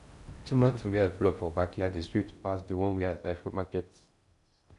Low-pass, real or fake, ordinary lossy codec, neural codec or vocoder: 10.8 kHz; fake; none; codec, 16 kHz in and 24 kHz out, 0.6 kbps, FocalCodec, streaming, 4096 codes